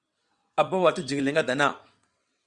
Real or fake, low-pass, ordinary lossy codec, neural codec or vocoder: fake; 9.9 kHz; Opus, 64 kbps; vocoder, 22.05 kHz, 80 mel bands, WaveNeXt